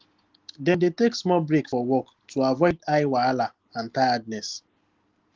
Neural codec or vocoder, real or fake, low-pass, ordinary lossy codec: none; real; 7.2 kHz; Opus, 16 kbps